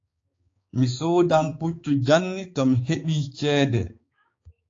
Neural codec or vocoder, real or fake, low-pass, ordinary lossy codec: codec, 16 kHz, 4 kbps, X-Codec, HuBERT features, trained on balanced general audio; fake; 7.2 kHz; AAC, 32 kbps